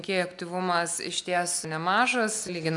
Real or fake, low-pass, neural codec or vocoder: real; 10.8 kHz; none